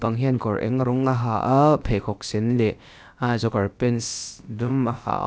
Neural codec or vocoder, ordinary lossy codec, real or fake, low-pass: codec, 16 kHz, about 1 kbps, DyCAST, with the encoder's durations; none; fake; none